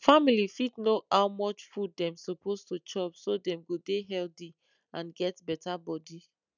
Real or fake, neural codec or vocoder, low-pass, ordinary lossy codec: real; none; 7.2 kHz; none